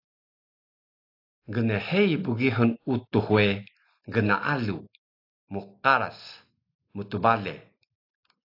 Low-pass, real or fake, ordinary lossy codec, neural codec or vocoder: 5.4 kHz; real; AAC, 32 kbps; none